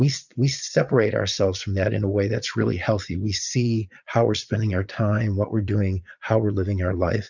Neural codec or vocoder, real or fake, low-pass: vocoder, 22.05 kHz, 80 mel bands, Vocos; fake; 7.2 kHz